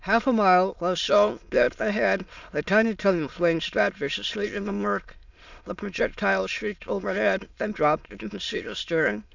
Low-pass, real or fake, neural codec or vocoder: 7.2 kHz; fake; autoencoder, 22.05 kHz, a latent of 192 numbers a frame, VITS, trained on many speakers